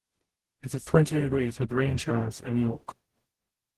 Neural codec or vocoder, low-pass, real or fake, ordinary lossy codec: codec, 44.1 kHz, 0.9 kbps, DAC; 14.4 kHz; fake; Opus, 16 kbps